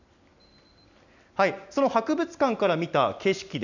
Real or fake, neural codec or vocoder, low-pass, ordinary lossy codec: real; none; 7.2 kHz; none